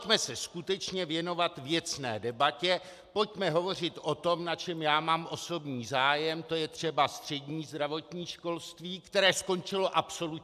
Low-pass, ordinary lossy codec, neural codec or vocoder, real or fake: 14.4 kHz; AAC, 96 kbps; none; real